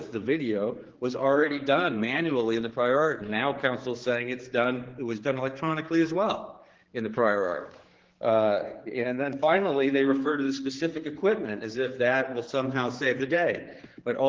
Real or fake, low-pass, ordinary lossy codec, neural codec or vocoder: fake; 7.2 kHz; Opus, 16 kbps; codec, 16 kHz, 4 kbps, X-Codec, HuBERT features, trained on general audio